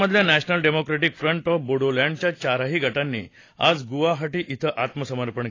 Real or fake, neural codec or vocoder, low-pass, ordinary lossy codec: real; none; 7.2 kHz; AAC, 32 kbps